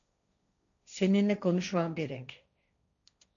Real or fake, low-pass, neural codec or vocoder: fake; 7.2 kHz; codec, 16 kHz, 1.1 kbps, Voila-Tokenizer